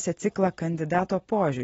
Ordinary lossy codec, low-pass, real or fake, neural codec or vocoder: AAC, 24 kbps; 19.8 kHz; real; none